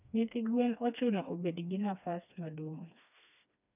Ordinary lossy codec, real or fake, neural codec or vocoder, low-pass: none; fake; codec, 16 kHz, 2 kbps, FreqCodec, smaller model; 3.6 kHz